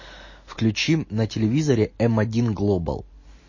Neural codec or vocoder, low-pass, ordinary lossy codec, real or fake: none; 7.2 kHz; MP3, 32 kbps; real